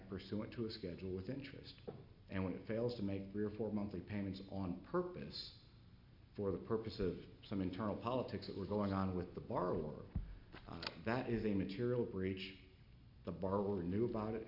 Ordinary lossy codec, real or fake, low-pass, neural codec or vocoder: MP3, 48 kbps; real; 5.4 kHz; none